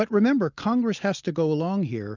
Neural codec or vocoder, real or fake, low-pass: none; real; 7.2 kHz